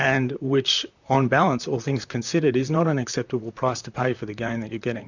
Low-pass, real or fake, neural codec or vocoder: 7.2 kHz; fake; vocoder, 44.1 kHz, 128 mel bands, Pupu-Vocoder